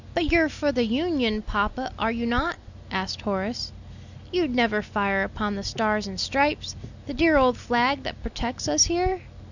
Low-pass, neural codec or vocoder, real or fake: 7.2 kHz; none; real